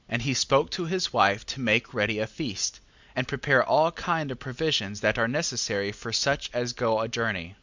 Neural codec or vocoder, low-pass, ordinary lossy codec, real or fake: none; 7.2 kHz; Opus, 64 kbps; real